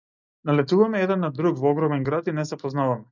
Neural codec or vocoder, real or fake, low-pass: none; real; 7.2 kHz